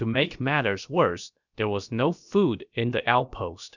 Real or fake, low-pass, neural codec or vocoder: fake; 7.2 kHz; codec, 16 kHz, about 1 kbps, DyCAST, with the encoder's durations